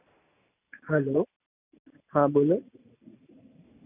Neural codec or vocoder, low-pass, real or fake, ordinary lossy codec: none; 3.6 kHz; real; none